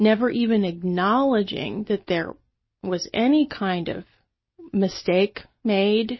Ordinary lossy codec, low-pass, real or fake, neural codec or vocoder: MP3, 24 kbps; 7.2 kHz; real; none